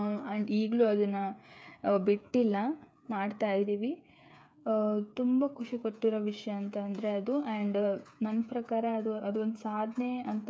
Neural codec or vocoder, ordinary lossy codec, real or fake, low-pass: codec, 16 kHz, 8 kbps, FreqCodec, smaller model; none; fake; none